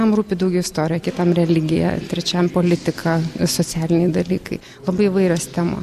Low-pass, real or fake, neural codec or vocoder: 14.4 kHz; real; none